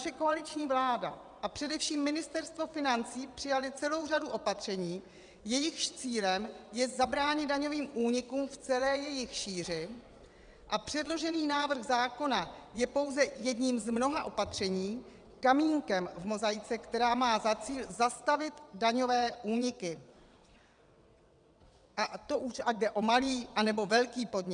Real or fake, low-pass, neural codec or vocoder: fake; 9.9 kHz; vocoder, 22.05 kHz, 80 mel bands, WaveNeXt